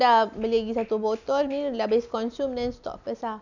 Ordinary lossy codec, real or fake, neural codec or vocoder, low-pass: none; real; none; 7.2 kHz